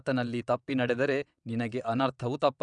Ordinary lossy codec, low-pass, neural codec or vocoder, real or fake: none; 9.9 kHz; vocoder, 22.05 kHz, 80 mel bands, WaveNeXt; fake